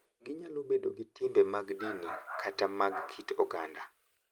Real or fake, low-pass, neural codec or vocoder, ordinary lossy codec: real; 19.8 kHz; none; Opus, 32 kbps